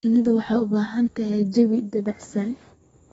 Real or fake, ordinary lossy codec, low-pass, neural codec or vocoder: fake; AAC, 24 kbps; 14.4 kHz; codec, 32 kHz, 1.9 kbps, SNAC